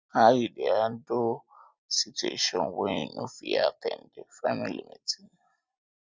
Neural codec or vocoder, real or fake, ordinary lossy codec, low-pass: none; real; none; none